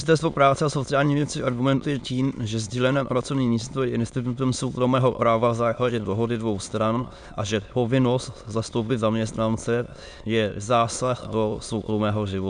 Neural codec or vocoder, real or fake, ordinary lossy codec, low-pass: autoencoder, 22.05 kHz, a latent of 192 numbers a frame, VITS, trained on many speakers; fake; MP3, 96 kbps; 9.9 kHz